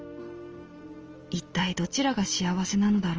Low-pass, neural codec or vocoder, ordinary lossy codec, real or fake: 7.2 kHz; none; Opus, 24 kbps; real